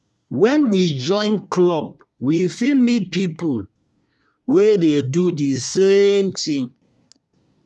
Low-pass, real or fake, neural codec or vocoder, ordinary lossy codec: none; fake; codec, 24 kHz, 1 kbps, SNAC; none